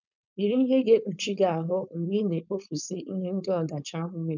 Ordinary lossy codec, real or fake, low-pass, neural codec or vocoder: none; fake; 7.2 kHz; codec, 16 kHz, 4.8 kbps, FACodec